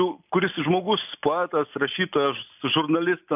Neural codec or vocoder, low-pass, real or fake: none; 3.6 kHz; real